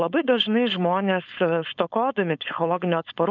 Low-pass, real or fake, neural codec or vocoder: 7.2 kHz; fake; codec, 16 kHz, 4.8 kbps, FACodec